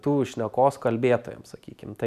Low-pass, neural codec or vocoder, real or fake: 14.4 kHz; none; real